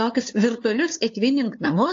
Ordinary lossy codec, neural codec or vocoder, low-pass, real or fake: AAC, 48 kbps; codec, 16 kHz, 2 kbps, FunCodec, trained on LibriTTS, 25 frames a second; 7.2 kHz; fake